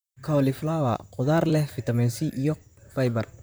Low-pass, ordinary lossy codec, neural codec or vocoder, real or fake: none; none; vocoder, 44.1 kHz, 128 mel bands, Pupu-Vocoder; fake